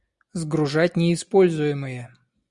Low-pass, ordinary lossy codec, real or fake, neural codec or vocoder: 10.8 kHz; Opus, 64 kbps; real; none